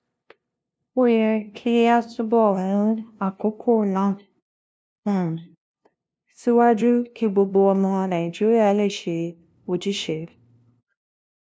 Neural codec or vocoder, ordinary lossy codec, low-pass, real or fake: codec, 16 kHz, 0.5 kbps, FunCodec, trained on LibriTTS, 25 frames a second; none; none; fake